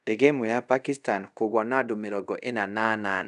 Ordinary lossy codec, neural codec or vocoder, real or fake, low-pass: none; codec, 24 kHz, 0.5 kbps, DualCodec; fake; 10.8 kHz